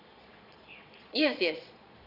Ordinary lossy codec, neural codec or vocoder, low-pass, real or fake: Opus, 64 kbps; vocoder, 22.05 kHz, 80 mel bands, Vocos; 5.4 kHz; fake